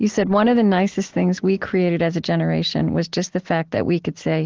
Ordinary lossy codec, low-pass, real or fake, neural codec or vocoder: Opus, 16 kbps; 7.2 kHz; real; none